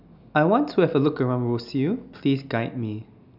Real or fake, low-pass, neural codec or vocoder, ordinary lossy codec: real; 5.4 kHz; none; none